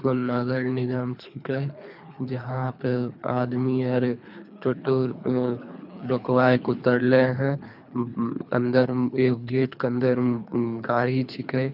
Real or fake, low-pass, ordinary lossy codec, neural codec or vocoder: fake; 5.4 kHz; none; codec, 24 kHz, 3 kbps, HILCodec